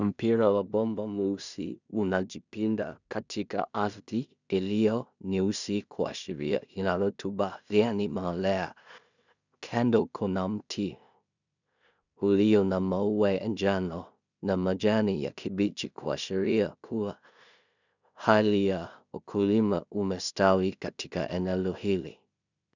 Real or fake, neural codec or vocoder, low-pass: fake; codec, 16 kHz in and 24 kHz out, 0.4 kbps, LongCat-Audio-Codec, two codebook decoder; 7.2 kHz